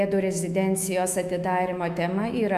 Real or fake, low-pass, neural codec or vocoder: fake; 14.4 kHz; autoencoder, 48 kHz, 128 numbers a frame, DAC-VAE, trained on Japanese speech